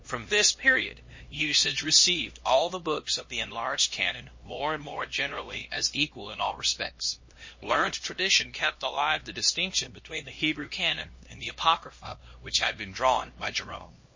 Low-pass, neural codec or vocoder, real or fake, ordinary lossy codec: 7.2 kHz; codec, 16 kHz, 1 kbps, X-Codec, HuBERT features, trained on LibriSpeech; fake; MP3, 32 kbps